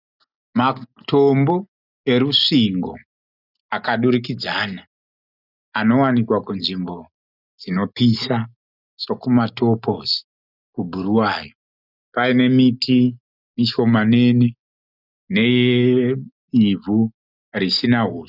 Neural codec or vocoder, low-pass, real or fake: none; 5.4 kHz; real